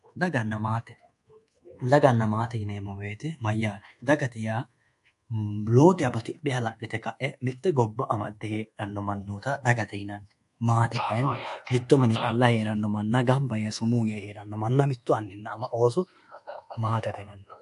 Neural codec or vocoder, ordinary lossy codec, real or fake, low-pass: codec, 24 kHz, 1.2 kbps, DualCodec; AAC, 64 kbps; fake; 10.8 kHz